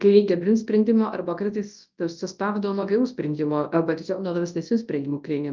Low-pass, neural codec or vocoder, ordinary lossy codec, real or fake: 7.2 kHz; codec, 24 kHz, 0.9 kbps, WavTokenizer, large speech release; Opus, 24 kbps; fake